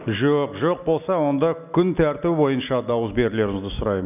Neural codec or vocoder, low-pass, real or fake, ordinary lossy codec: none; 3.6 kHz; real; none